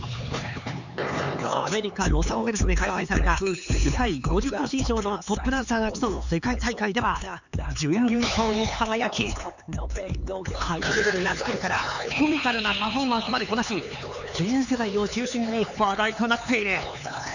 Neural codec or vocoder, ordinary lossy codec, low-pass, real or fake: codec, 16 kHz, 4 kbps, X-Codec, HuBERT features, trained on LibriSpeech; none; 7.2 kHz; fake